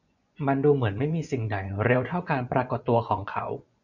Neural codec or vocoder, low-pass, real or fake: vocoder, 24 kHz, 100 mel bands, Vocos; 7.2 kHz; fake